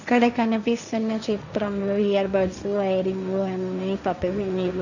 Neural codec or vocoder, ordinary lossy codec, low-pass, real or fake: codec, 16 kHz, 1.1 kbps, Voila-Tokenizer; none; 7.2 kHz; fake